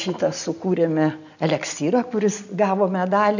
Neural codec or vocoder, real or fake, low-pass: none; real; 7.2 kHz